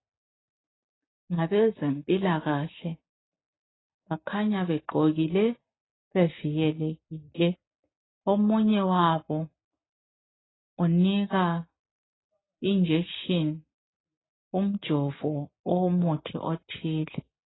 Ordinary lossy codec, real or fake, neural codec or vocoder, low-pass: AAC, 16 kbps; real; none; 7.2 kHz